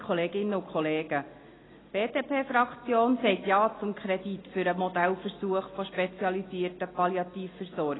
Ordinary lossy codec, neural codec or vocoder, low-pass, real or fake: AAC, 16 kbps; none; 7.2 kHz; real